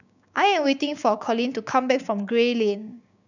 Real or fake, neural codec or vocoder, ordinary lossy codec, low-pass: fake; codec, 16 kHz, 6 kbps, DAC; none; 7.2 kHz